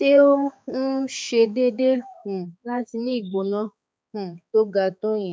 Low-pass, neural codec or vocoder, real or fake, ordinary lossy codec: none; codec, 16 kHz, 4 kbps, X-Codec, HuBERT features, trained on balanced general audio; fake; none